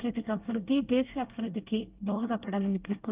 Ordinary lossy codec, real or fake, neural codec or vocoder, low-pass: Opus, 32 kbps; fake; codec, 24 kHz, 1 kbps, SNAC; 3.6 kHz